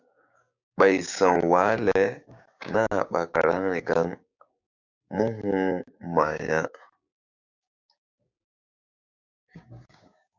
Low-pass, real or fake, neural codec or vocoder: 7.2 kHz; fake; codec, 16 kHz, 6 kbps, DAC